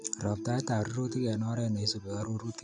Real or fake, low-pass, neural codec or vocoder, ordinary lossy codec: real; 10.8 kHz; none; none